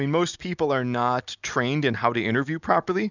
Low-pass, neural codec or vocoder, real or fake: 7.2 kHz; none; real